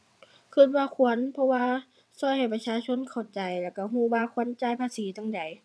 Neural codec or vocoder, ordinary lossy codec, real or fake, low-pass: vocoder, 22.05 kHz, 80 mel bands, WaveNeXt; none; fake; none